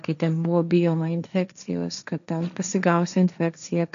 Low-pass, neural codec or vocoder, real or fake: 7.2 kHz; codec, 16 kHz, 1.1 kbps, Voila-Tokenizer; fake